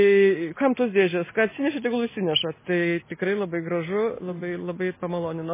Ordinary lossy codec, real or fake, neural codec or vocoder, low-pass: MP3, 16 kbps; real; none; 3.6 kHz